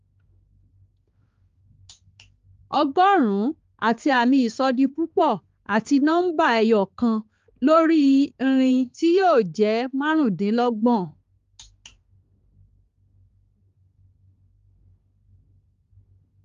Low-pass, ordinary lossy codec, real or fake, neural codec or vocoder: 7.2 kHz; Opus, 32 kbps; fake; codec, 16 kHz, 4 kbps, X-Codec, HuBERT features, trained on balanced general audio